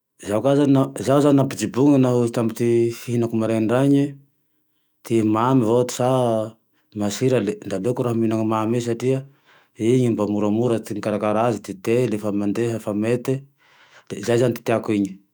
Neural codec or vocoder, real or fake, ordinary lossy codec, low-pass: autoencoder, 48 kHz, 128 numbers a frame, DAC-VAE, trained on Japanese speech; fake; none; none